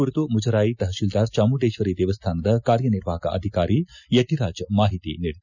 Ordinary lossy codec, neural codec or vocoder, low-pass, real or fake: none; none; 7.2 kHz; real